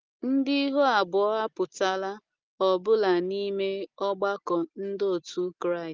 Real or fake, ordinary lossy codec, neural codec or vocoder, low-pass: real; Opus, 24 kbps; none; 7.2 kHz